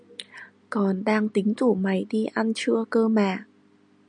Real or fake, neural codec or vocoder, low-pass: real; none; 9.9 kHz